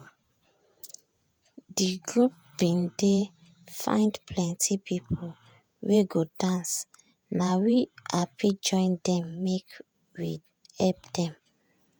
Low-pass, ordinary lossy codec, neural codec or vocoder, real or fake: none; none; vocoder, 48 kHz, 128 mel bands, Vocos; fake